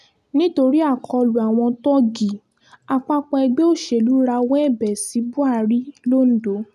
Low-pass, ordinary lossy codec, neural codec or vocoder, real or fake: 14.4 kHz; none; none; real